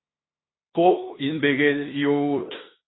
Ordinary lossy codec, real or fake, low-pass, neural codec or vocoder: AAC, 16 kbps; fake; 7.2 kHz; codec, 16 kHz in and 24 kHz out, 0.9 kbps, LongCat-Audio-Codec, fine tuned four codebook decoder